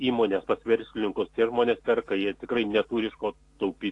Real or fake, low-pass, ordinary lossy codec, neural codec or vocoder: real; 10.8 kHz; AAC, 48 kbps; none